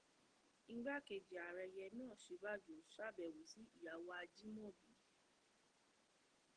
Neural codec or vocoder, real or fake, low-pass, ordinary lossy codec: none; real; 9.9 kHz; Opus, 16 kbps